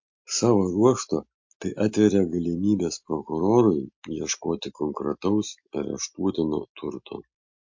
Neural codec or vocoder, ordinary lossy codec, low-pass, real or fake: none; MP3, 48 kbps; 7.2 kHz; real